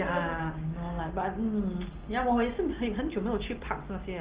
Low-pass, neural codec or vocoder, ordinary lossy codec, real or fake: 3.6 kHz; none; Opus, 16 kbps; real